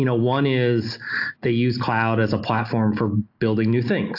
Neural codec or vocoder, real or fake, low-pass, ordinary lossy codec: none; real; 5.4 kHz; AAC, 48 kbps